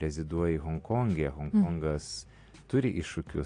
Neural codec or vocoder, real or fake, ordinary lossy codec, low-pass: none; real; AAC, 48 kbps; 9.9 kHz